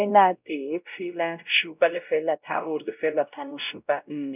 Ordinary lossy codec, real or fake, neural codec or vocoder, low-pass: none; fake; codec, 16 kHz, 0.5 kbps, X-Codec, WavLM features, trained on Multilingual LibriSpeech; 3.6 kHz